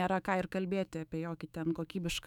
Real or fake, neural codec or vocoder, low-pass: fake; autoencoder, 48 kHz, 128 numbers a frame, DAC-VAE, trained on Japanese speech; 19.8 kHz